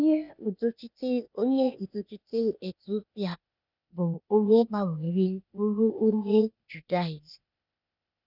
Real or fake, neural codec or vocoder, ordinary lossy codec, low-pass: fake; codec, 16 kHz, 0.8 kbps, ZipCodec; none; 5.4 kHz